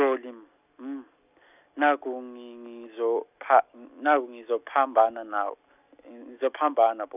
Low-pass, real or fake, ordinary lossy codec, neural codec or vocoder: 3.6 kHz; real; none; none